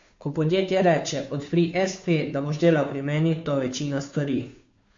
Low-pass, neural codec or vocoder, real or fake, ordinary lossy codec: 7.2 kHz; codec, 16 kHz, 2 kbps, FunCodec, trained on Chinese and English, 25 frames a second; fake; MP3, 48 kbps